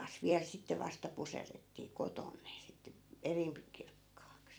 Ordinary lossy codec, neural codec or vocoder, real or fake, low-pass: none; vocoder, 44.1 kHz, 128 mel bands every 256 samples, BigVGAN v2; fake; none